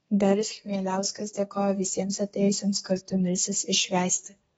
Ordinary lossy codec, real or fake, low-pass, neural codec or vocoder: AAC, 24 kbps; fake; 14.4 kHz; codec, 32 kHz, 1.9 kbps, SNAC